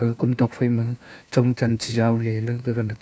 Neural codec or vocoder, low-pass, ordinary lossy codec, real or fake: codec, 16 kHz, 1 kbps, FunCodec, trained on LibriTTS, 50 frames a second; none; none; fake